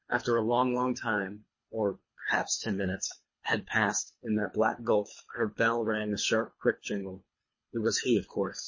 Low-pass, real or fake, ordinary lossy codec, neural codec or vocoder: 7.2 kHz; fake; MP3, 32 kbps; codec, 24 kHz, 3 kbps, HILCodec